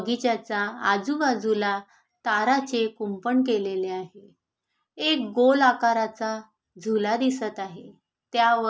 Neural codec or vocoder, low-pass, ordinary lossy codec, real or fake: none; none; none; real